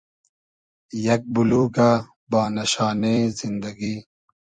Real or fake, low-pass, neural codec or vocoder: fake; 9.9 kHz; vocoder, 44.1 kHz, 128 mel bands every 256 samples, BigVGAN v2